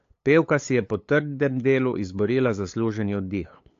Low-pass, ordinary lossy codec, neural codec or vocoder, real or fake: 7.2 kHz; AAC, 48 kbps; codec, 16 kHz, 8 kbps, FunCodec, trained on LibriTTS, 25 frames a second; fake